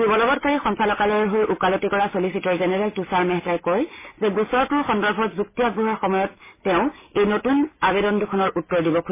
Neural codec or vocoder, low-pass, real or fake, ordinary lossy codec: none; 3.6 kHz; real; MP3, 16 kbps